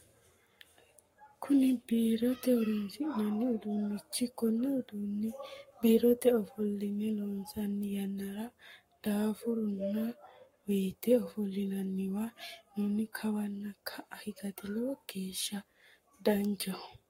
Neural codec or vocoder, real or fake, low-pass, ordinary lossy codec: codec, 44.1 kHz, 7.8 kbps, Pupu-Codec; fake; 19.8 kHz; AAC, 48 kbps